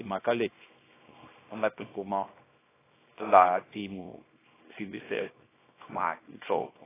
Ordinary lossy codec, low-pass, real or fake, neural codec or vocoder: AAC, 16 kbps; 3.6 kHz; fake; codec, 24 kHz, 0.9 kbps, WavTokenizer, small release